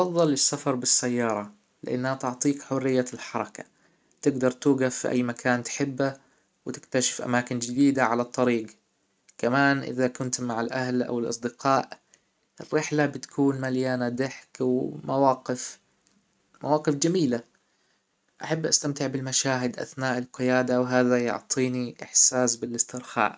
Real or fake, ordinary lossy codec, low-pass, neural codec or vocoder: real; none; none; none